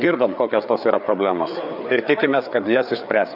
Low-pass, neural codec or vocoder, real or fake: 5.4 kHz; codec, 16 kHz, 4 kbps, FreqCodec, larger model; fake